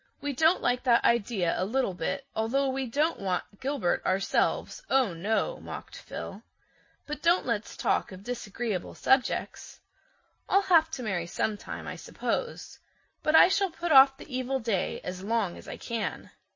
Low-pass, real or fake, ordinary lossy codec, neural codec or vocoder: 7.2 kHz; real; MP3, 32 kbps; none